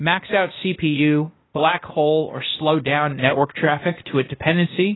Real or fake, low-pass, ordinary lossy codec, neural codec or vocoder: fake; 7.2 kHz; AAC, 16 kbps; codec, 16 kHz, 0.8 kbps, ZipCodec